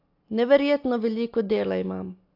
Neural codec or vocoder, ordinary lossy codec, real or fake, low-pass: none; AAC, 32 kbps; real; 5.4 kHz